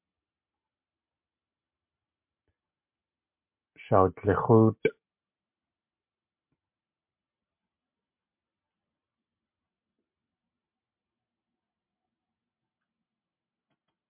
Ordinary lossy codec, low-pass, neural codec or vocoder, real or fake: MP3, 32 kbps; 3.6 kHz; none; real